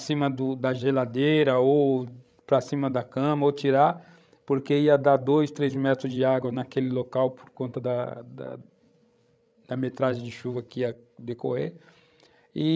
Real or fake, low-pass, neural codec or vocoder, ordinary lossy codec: fake; none; codec, 16 kHz, 16 kbps, FreqCodec, larger model; none